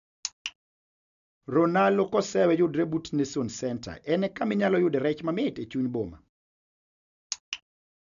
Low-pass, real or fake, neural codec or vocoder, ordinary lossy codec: 7.2 kHz; real; none; none